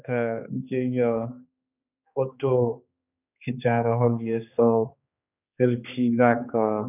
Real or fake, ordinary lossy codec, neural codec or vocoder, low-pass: fake; none; codec, 16 kHz, 2 kbps, X-Codec, HuBERT features, trained on general audio; 3.6 kHz